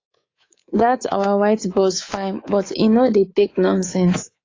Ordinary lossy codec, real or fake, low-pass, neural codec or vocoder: AAC, 32 kbps; fake; 7.2 kHz; codec, 24 kHz, 3.1 kbps, DualCodec